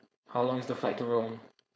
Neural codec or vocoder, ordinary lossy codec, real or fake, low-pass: codec, 16 kHz, 4.8 kbps, FACodec; none; fake; none